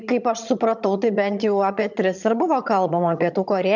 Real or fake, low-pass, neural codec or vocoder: fake; 7.2 kHz; vocoder, 22.05 kHz, 80 mel bands, HiFi-GAN